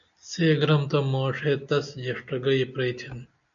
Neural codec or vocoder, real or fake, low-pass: none; real; 7.2 kHz